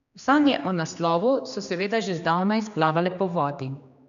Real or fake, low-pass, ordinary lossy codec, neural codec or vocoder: fake; 7.2 kHz; none; codec, 16 kHz, 2 kbps, X-Codec, HuBERT features, trained on general audio